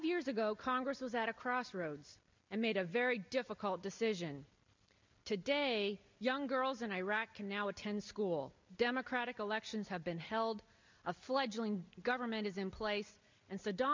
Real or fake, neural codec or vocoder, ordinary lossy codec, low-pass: real; none; MP3, 64 kbps; 7.2 kHz